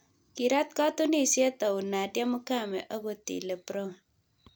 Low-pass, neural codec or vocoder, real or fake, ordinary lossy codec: none; none; real; none